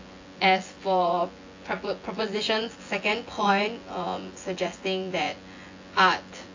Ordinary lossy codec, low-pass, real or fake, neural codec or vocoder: AAC, 48 kbps; 7.2 kHz; fake; vocoder, 24 kHz, 100 mel bands, Vocos